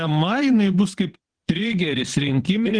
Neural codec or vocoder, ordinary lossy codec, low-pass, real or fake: vocoder, 22.05 kHz, 80 mel bands, WaveNeXt; Opus, 16 kbps; 9.9 kHz; fake